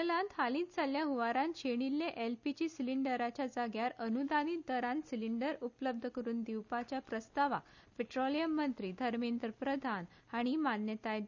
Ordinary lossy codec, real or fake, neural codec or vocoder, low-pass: none; real; none; 7.2 kHz